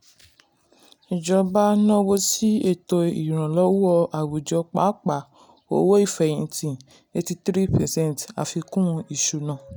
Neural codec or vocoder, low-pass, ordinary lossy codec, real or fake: none; none; none; real